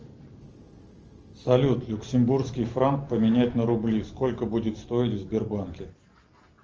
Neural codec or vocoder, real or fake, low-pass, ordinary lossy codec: none; real; 7.2 kHz; Opus, 24 kbps